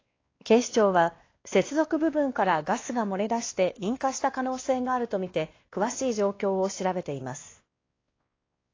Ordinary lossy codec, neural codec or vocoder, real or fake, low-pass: AAC, 32 kbps; codec, 16 kHz, 2 kbps, X-Codec, WavLM features, trained on Multilingual LibriSpeech; fake; 7.2 kHz